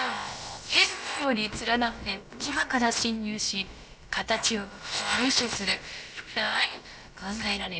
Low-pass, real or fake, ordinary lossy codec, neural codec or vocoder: none; fake; none; codec, 16 kHz, about 1 kbps, DyCAST, with the encoder's durations